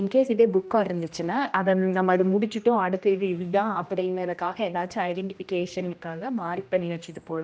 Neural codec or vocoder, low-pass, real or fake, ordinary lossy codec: codec, 16 kHz, 1 kbps, X-Codec, HuBERT features, trained on general audio; none; fake; none